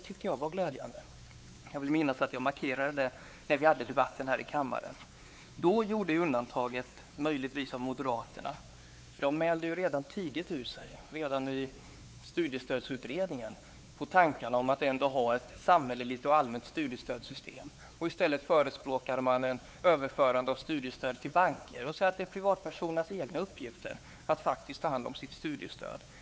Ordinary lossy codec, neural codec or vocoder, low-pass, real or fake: none; codec, 16 kHz, 4 kbps, X-Codec, WavLM features, trained on Multilingual LibriSpeech; none; fake